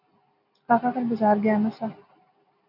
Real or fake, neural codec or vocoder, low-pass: real; none; 5.4 kHz